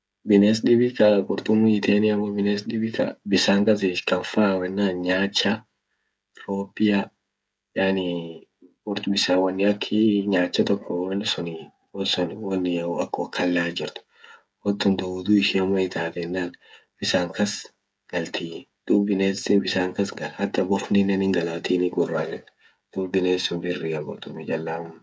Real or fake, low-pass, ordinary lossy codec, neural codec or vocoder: fake; none; none; codec, 16 kHz, 16 kbps, FreqCodec, smaller model